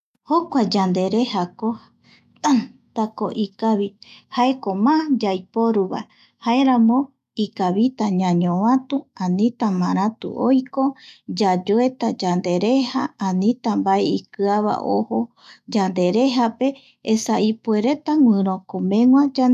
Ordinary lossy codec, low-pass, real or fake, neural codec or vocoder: none; 14.4 kHz; fake; autoencoder, 48 kHz, 128 numbers a frame, DAC-VAE, trained on Japanese speech